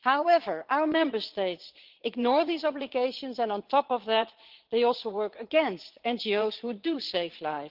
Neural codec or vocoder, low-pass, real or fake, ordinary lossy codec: vocoder, 22.05 kHz, 80 mel bands, Vocos; 5.4 kHz; fake; Opus, 16 kbps